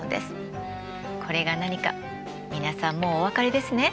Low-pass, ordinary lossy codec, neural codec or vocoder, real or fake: none; none; none; real